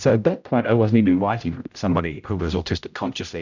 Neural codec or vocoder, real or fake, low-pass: codec, 16 kHz, 0.5 kbps, X-Codec, HuBERT features, trained on general audio; fake; 7.2 kHz